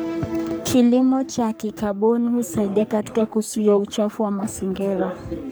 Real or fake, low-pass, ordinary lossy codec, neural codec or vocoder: fake; none; none; codec, 44.1 kHz, 3.4 kbps, Pupu-Codec